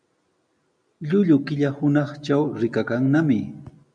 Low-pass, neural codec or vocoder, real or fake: 9.9 kHz; none; real